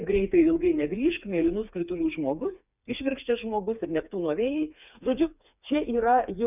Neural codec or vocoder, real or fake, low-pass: codec, 16 kHz, 4 kbps, FreqCodec, smaller model; fake; 3.6 kHz